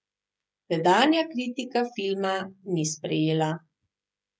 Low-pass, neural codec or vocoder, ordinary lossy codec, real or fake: none; codec, 16 kHz, 16 kbps, FreqCodec, smaller model; none; fake